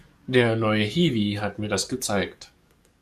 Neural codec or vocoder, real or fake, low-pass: codec, 44.1 kHz, 7.8 kbps, DAC; fake; 14.4 kHz